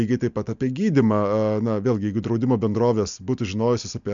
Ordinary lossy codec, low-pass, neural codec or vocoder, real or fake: MP3, 64 kbps; 7.2 kHz; none; real